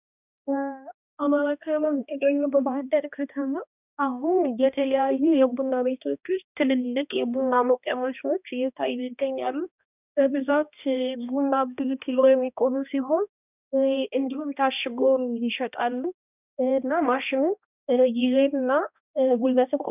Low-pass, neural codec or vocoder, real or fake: 3.6 kHz; codec, 16 kHz, 1 kbps, X-Codec, HuBERT features, trained on balanced general audio; fake